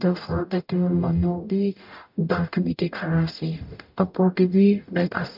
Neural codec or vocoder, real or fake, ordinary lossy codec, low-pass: codec, 44.1 kHz, 0.9 kbps, DAC; fake; MP3, 48 kbps; 5.4 kHz